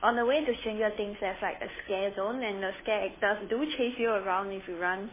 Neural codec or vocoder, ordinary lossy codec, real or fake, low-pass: codec, 16 kHz, 2 kbps, FunCodec, trained on Chinese and English, 25 frames a second; MP3, 16 kbps; fake; 3.6 kHz